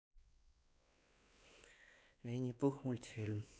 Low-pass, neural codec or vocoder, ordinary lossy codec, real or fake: none; codec, 16 kHz, 2 kbps, X-Codec, WavLM features, trained on Multilingual LibriSpeech; none; fake